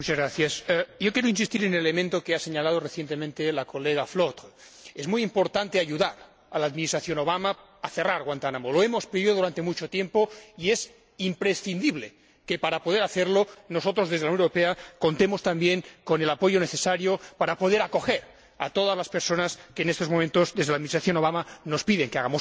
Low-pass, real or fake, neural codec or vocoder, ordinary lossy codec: none; real; none; none